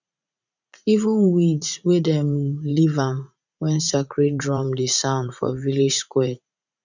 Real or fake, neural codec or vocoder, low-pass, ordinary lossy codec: fake; vocoder, 44.1 kHz, 80 mel bands, Vocos; 7.2 kHz; none